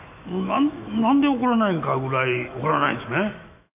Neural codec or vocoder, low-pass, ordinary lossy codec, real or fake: autoencoder, 48 kHz, 128 numbers a frame, DAC-VAE, trained on Japanese speech; 3.6 kHz; none; fake